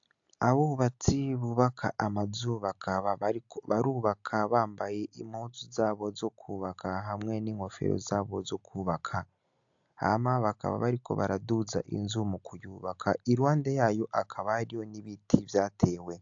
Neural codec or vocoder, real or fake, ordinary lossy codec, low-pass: none; real; AAC, 64 kbps; 7.2 kHz